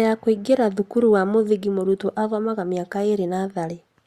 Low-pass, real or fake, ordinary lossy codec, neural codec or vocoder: 14.4 kHz; real; Opus, 64 kbps; none